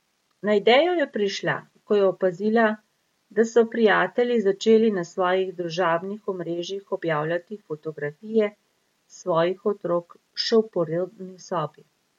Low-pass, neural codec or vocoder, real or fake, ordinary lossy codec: 19.8 kHz; none; real; MP3, 64 kbps